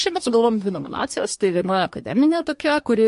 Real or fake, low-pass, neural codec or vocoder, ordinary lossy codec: fake; 10.8 kHz; codec, 24 kHz, 1 kbps, SNAC; MP3, 48 kbps